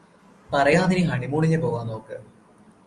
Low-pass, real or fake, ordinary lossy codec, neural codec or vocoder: 10.8 kHz; real; Opus, 32 kbps; none